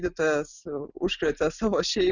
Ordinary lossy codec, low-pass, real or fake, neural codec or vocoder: Opus, 64 kbps; 7.2 kHz; real; none